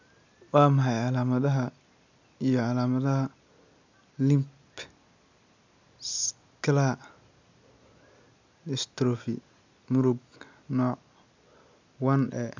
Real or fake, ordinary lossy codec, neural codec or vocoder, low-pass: real; MP3, 64 kbps; none; 7.2 kHz